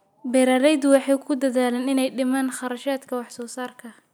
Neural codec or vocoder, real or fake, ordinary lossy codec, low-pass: none; real; none; none